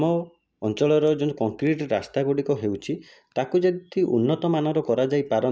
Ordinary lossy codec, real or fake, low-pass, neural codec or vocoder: none; real; none; none